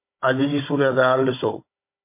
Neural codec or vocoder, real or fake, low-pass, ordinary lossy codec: codec, 16 kHz, 16 kbps, FunCodec, trained on Chinese and English, 50 frames a second; fake; 3.6 kHz; MP3, 24 kbps